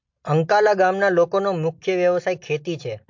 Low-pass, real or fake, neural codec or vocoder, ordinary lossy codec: 7.2 kHz; real; none; MP3, 48 kbps